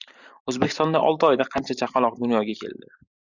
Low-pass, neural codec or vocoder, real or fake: 7.2 kHz; vocoder, 44.1 kHz, 128 mel bands every 512 samples, BigVGAN v2; fake